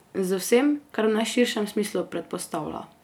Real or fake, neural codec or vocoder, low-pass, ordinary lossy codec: real; none; none; none